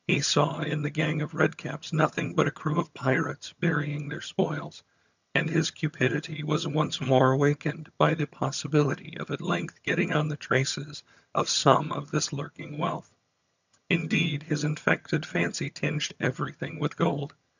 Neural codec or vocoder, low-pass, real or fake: vocoder, 22.05 kHz, 80 mel bands, HiFi-GAN; 7.2 kHz; fake